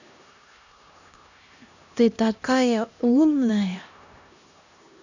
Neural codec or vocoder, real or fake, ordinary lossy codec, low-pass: codec, 16 kHz, 1 kbps, X-Codec, HuBERT features, trained on LibriSpeech; fake; none; 7.2 kHz